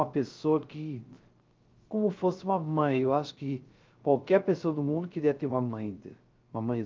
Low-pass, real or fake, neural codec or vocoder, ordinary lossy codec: 7.2 kHz; fake; codec, 16 kHz, 0.3 kbps, FocalCodec; Opus, 24 kbps